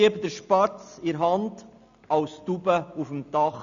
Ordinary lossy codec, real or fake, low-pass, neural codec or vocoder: none; real; 7.2 kHz; none